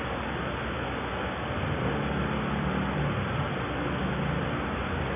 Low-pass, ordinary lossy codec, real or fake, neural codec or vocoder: 3.6 kHz; AAC, 16 kbps; real; none